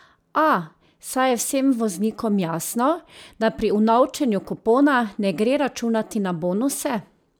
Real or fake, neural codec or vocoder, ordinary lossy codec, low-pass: real; none; none; none